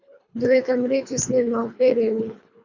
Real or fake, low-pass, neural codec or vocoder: fake; 7.2 kHz; codec, 24 kHz, 3 kbps, HILCodec